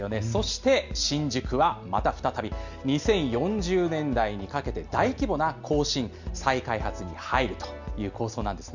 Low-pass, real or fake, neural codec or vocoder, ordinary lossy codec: 7.2 kHz; real; none; none